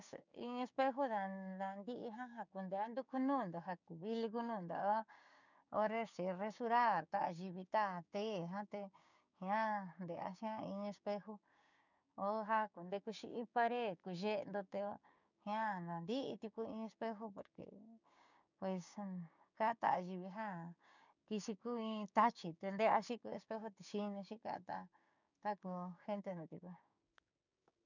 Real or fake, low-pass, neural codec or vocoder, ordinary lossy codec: fake; 7.2 kHz; codec, 16 kHz, 6 kbps, DAC; none